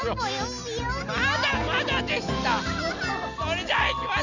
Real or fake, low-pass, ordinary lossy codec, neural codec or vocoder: real; 7.2 kHz; none; none